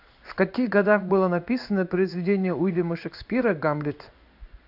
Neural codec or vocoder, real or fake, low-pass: codec, 16 kHz in and 24 kHz out, 1 kbps, XY-Tokenizer; fake; 5.4 kHz